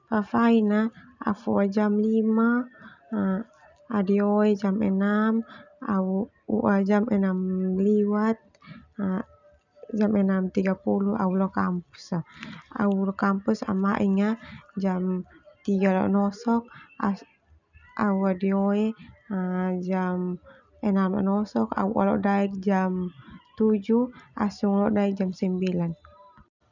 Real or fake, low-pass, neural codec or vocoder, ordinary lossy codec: real; 7.2 kHz; none; none